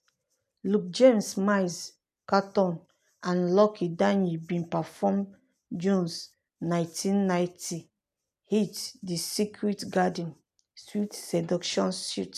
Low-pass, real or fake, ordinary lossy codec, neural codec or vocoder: 14.4 kHz; real; none; none